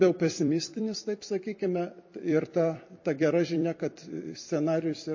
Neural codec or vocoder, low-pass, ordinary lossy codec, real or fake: vocoder, 44.1 kHz, 128 mel bands every 256 samples, BigVGAN v2; 7.2 kHz; MP3, 32 kbps; fake